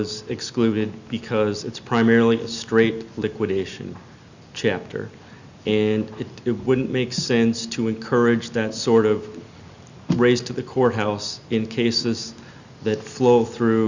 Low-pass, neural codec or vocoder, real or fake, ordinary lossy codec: 7.2 kHz; none; real; Opus, 64 kbps